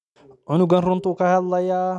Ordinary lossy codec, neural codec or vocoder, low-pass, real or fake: none; none; 10.8 kHz; real